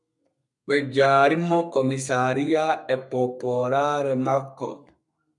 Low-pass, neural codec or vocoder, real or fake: 10.8 kHz; codec, 32 kHz, 1.9 kbps, SNAC; fake